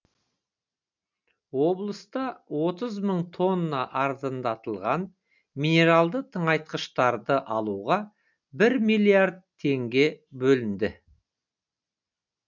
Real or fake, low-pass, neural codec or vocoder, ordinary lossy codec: real; 7.2 kHz; none; none